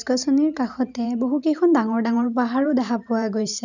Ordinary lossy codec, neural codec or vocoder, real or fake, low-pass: none; vocoder, 44.1 kHz, 128 mel bands every 512 samples, BigVGAN v2; fake; 7.2 kHz